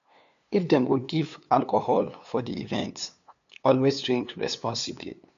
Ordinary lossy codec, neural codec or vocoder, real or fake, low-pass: MP3, 64 kbps; codec, 16 kHz, 2 kbps, FunCodec, trained on LibriTTS, 25 frames a second; fake; 7.2 kHz